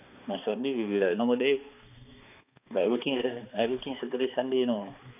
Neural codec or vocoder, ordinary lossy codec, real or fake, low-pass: codec, 16 kHz, 2 kbps, X-Codec, HuBERT features, trained on balanced general audio; none; fake; 3.6 kHz